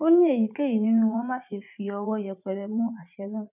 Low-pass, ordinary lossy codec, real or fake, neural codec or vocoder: 3.6 kHz; none; fake; vocoder, 44.1 kHz, 80 mel bands, Vocos